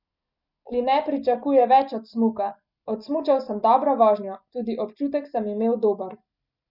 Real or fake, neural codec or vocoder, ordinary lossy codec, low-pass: real; none; none; 5.4 kHz